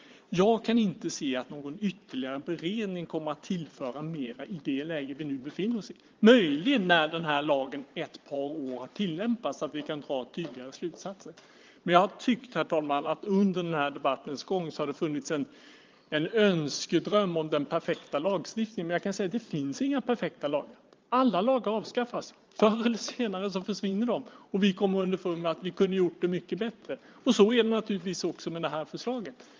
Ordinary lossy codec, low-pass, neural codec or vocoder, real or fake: Opus, 32 kbps; 7.2 kHz; vocoder, 22.05 kHz, 80 mel bands, Vocos; fake